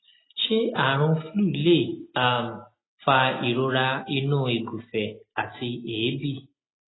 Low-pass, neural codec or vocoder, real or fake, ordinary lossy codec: 7.2 kHz; none; real; AAC, 16 kbps